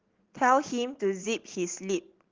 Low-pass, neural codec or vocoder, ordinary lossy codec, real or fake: 7.2 kHz; none; Opus, 16 kbps; real